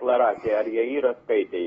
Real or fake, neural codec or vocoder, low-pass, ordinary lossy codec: real; none; 19.8 kHz; AAC, 24 kbps